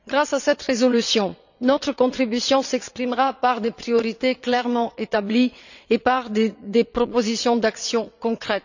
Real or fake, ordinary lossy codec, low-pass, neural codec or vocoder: fake; none; 7.2 kHz; vocoder, 22.05 kHz, 80 mel bands, WaveNeXt